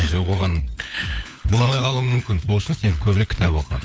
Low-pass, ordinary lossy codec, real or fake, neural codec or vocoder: none; none; fake; codec, 16 kHz, 4 kbps, FunCodec, trained on LibriTTS, 50 frames a second